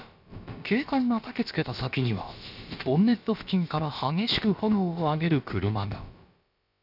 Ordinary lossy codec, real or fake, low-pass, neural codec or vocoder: none; fake; 5.4 kHz; codec, 16 kHz, about 1 kbps, DyCAST, with the encoder's durations